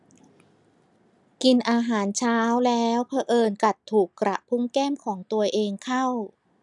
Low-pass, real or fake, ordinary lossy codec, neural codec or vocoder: 10.8 kHz; real; none; none